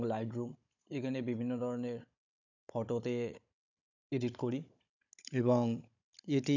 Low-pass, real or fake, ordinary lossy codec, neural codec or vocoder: 7.2 kHz; fake; none; codec, 16 kHz, 16 kbps, FunCodec, trained on Chinese and English, 50 frames a second